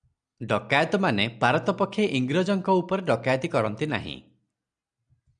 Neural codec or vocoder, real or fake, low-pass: none; real; 9.9 kHz